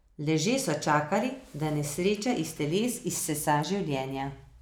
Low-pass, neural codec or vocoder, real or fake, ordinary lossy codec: none; none; real; none